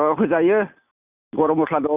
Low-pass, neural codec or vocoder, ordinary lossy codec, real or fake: 3.6 kHz; none; none; real